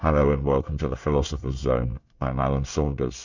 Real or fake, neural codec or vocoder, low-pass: fake; codec, 24 kHz, 1 kbps, SNAC; 7.2 kHz